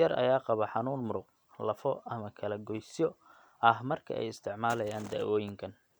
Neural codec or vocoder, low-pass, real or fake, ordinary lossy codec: none; none; real; none